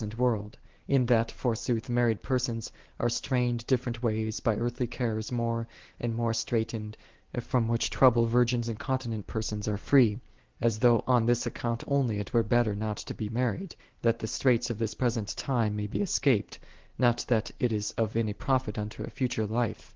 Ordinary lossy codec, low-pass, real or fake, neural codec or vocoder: Opus, 16 kbps; 7.2 kHz; real; none